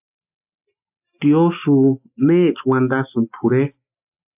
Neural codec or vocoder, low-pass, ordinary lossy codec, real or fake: none; 3.6 kHz; AAC, 32 kbps; real